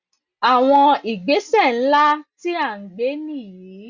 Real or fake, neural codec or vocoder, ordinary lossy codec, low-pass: real; none; Opus, 64 kbps; 7.2 kHz